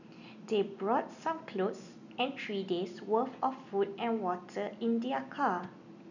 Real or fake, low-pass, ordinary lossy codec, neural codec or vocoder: real; 7.2 kHz; none; none